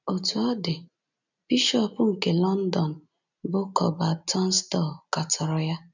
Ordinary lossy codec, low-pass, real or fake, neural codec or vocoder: none; 7.2 kHz; real; none